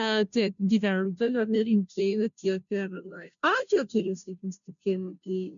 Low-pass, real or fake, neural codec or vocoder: 7.2 kHz; fake; codec, 16 kHz, 0.5 kbps, FunCodec, trained on Chinese and English, 25 frames a second